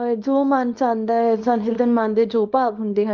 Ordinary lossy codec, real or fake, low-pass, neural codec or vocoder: Opus, 24 kbps; fake; 7.2 kHz; codec, 24 kHz, 0.9 kbps, WavTokenizer, small release